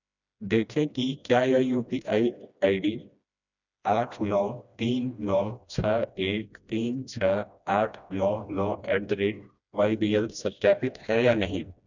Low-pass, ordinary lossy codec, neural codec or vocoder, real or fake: 7.2 kHz; none; codec, 16 kHz, 1 kbps, FreqCodec, smaller model; fake